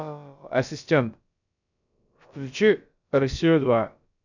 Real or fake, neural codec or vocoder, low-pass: fake; codec, 16 kHz, about 1 kbps, DyCAST, with the encoder's durations; 7.2 kHz